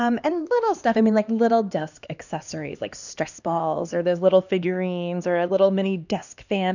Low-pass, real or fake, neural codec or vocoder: 7.2 kHz; fake; codec, 16 kHz, 2 kbps, X-Codec, HuBERT features, trained on LibriSpeech